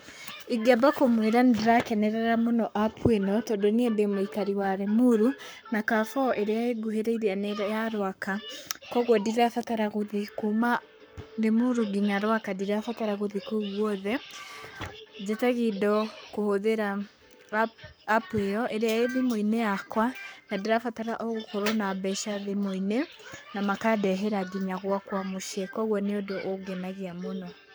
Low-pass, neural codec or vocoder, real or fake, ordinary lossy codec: none; codec, 44.1 kHz, 7.8 kbps, Pupu-Codec; fake; none